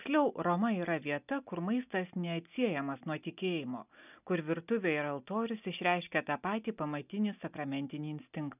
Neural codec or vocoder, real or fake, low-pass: none; real; 3.6 kHz